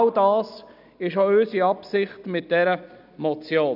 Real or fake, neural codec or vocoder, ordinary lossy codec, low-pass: real; none; none; 5.4 kHz